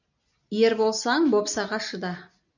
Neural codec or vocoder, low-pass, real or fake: none; 7.2 kHz; real